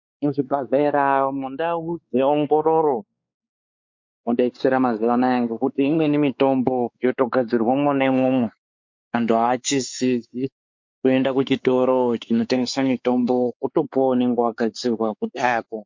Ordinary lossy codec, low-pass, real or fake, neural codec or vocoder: MP3, 48 kbps; 7.2 kHz; fake; codec, 16 kHz, 4 kbps, X-Codec, WavLM features, trained on Multilingual LibriSpeech